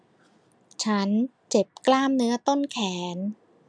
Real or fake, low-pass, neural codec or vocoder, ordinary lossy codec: real; 9.9 kHz; none; none